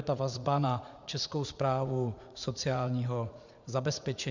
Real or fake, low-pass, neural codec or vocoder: fake; 7.2 kHz; vocoder, 44.1 kHz, 80 mel bands, Vocos